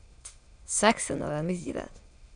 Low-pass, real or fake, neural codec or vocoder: 9.9 kHz; fake; autoencoder, 22.05 kHz, a latent of 192 numbers a frame, VITS, trained on many speakers